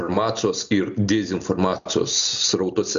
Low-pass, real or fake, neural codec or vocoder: 7.2 kHz; real; none